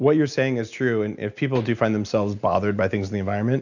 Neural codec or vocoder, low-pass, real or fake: none; 7.2 kHz; real